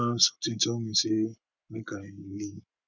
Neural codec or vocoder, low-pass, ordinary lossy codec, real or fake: codec, 44.1 kHz, 7.8 kbps, Pupu-Codec; 7.2 kHz; none; fake